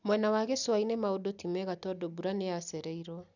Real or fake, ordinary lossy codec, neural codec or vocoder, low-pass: real; none; none; 7.2 kHz